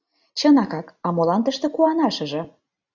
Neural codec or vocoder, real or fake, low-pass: none; real; 7.2 kHz